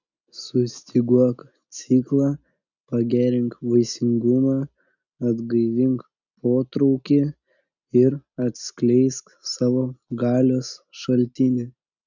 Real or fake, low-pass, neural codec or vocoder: real; 7.2 kHz; none